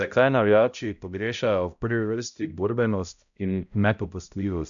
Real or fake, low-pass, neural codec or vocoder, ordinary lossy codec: fake; 7.2 kHz; codec, 16 kHz, 0.5 kbps, X-Codec, HuBERT features, trained on balanced general audio; none